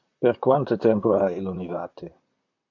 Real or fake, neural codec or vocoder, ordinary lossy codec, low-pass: fake; vocoder, 44.1 kHz, 128 mel bands, Pupu-Vocoder; MP3, 64 kbps; 7.2 kHz